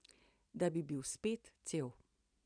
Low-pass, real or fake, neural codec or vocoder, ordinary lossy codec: 9.9 kHz; real; none; none